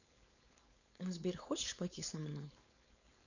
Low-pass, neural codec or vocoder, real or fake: 7.2 kHz; codec, 16 kHz, 4.8 kbps, FACodec; fake